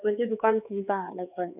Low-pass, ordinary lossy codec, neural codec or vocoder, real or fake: 3.6 kHz; none; codec, 16 kHz, 4 kbps, X-Codec, HuBERT features, trained on LibriSpeech; fake